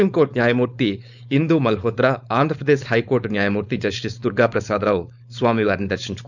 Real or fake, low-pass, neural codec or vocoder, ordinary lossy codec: fake; 7.2 kHz; codec, 16 kHz, 4.8 kbps, FACodec; none